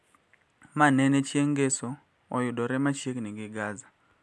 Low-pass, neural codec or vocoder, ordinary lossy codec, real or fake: none; none; none; real